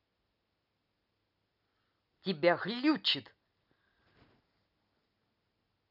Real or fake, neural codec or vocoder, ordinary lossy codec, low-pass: real; none; none; 5.4 kHz